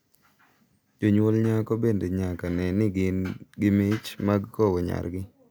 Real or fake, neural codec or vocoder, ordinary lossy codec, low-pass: real; none; none; none